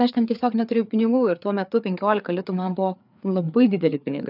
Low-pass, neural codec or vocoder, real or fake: 5.4 kHz; codec, 16 kHz, 4 kbps, FreqCodec, larger model; fake